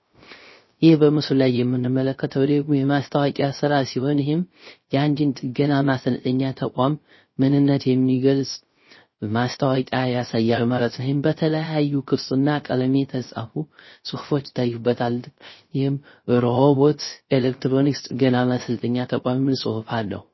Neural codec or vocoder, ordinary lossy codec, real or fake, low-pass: codec, 16 kHz, 0.3 kbps, FocalCodec; MP3, 24 kbps; fake; 7.2 kHz